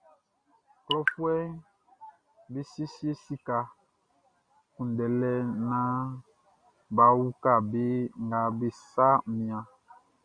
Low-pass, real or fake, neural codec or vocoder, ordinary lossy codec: 9.9 kHz; real; none; Opus, 64 kbps